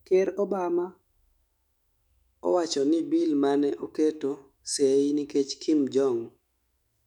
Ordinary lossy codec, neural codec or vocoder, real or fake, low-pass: none; autoencoder, 48 kHz, 128 numbers a frame, DAC-VAE, trained on Japanese speech; fake; 19.8 kHz